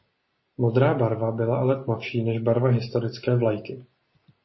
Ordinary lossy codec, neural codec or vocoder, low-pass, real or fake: MP3, 24 kbps; none; 7.2 kHz; real